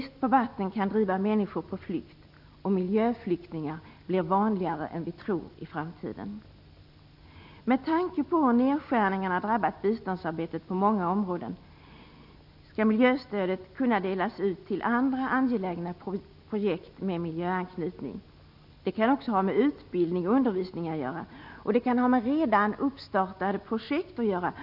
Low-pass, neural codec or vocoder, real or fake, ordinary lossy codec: 5.4 kHz; none; real; none